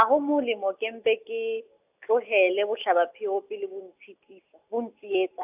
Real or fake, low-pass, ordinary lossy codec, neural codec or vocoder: real; 3.6 kHz; AAC, 32 kbps; none